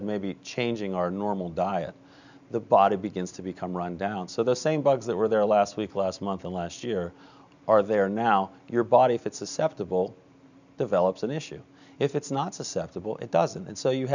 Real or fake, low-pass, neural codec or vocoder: real; 7.2 kHz; none